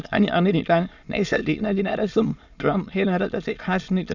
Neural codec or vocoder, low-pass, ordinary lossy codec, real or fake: autoencoder, 22.05 kHz, a latent of 192 numbers a frame, VITS, trained on many speakers; 7.2 kHz; none; fake